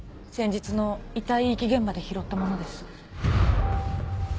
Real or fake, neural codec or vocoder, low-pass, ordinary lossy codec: real; none; none; none